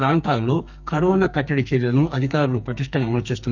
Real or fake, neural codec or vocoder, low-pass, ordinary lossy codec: fake; codec, 44.1 kHz, 2.6 kbps, SNAC; 7.2 kHz; none